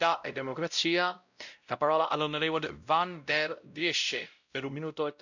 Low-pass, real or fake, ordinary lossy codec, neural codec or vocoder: 7.2 kHz; fake; none; codec, 16 kHz, 0.5 kbps, X-Codec, WavLM features, trained on Multilingual LibriSpeech